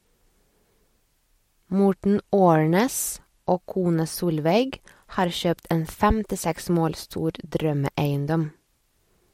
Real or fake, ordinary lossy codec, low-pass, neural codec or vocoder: real; MP3, 64 kbps; 19.8 kHz; none